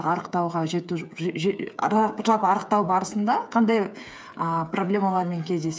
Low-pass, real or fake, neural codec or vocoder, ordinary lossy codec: none; fake; codec, 16 kHz, 8 kbps, FreqCodec, smaller model; none